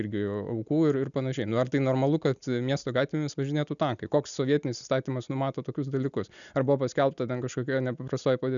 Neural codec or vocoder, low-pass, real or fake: none; 7.2 kHz; real